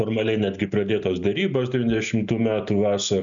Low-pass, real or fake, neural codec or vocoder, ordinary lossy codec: 7.2 kHz; real; none; MP3, 96 kbps